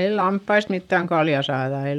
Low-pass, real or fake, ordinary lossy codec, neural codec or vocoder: 19.8 kHz; fake; none; vocoder, 44.1 kHz, 128 mel bands, Pupu-Vocoder